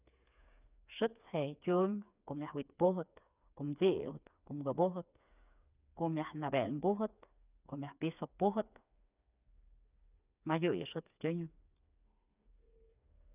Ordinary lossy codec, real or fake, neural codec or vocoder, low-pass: none; fake; codec, 16 kHz, 4 kbps, FreqCodec, smaller model; 3.6 kHz